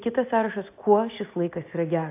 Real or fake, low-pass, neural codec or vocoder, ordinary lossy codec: real; 3.6 kHz; none; AAC, 24 kbps